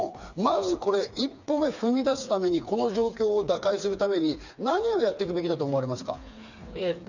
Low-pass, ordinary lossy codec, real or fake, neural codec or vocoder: 7.2 kHz; none; fake; codec, 16 kHz, 4 kbps, FreqCodec, smaller model